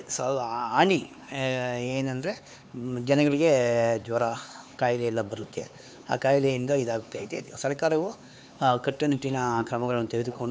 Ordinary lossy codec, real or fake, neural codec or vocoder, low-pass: none; fake; codec, 16 kHz, 4 kbps, X-Codec, HuBERT features, trained on LibriSpeech; none